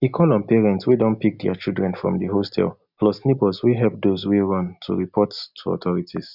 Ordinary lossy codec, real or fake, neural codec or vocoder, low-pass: none; real; none; 5.4 kHz